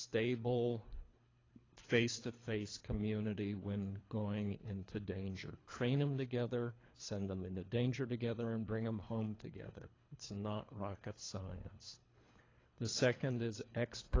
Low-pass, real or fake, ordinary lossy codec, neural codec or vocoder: 7.2 kHz; fake; AAC, 32 kbps; codec, 24 kHz, 3 kbps, HILCodec